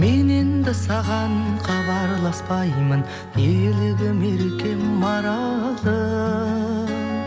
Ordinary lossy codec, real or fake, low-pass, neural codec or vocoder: none; real; none; none